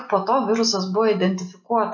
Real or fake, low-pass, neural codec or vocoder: real; 7.2 kHz; none